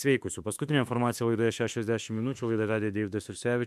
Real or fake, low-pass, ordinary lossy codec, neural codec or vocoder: fake; 14.4 kHz; MP3, 96 kbps; autoencoder, 48 kHz, 32 numbers a frame, DAC-VAE, trained on Japanese speech